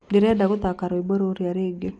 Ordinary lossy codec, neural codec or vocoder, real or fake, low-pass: none; none; real; 9.9 kHz